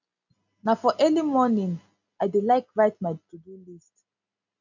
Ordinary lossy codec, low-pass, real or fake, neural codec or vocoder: none; 7.2 kHz; real; none